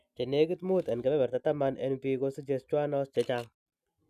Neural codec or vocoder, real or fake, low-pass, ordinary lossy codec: none; real; 14.4 kHz; none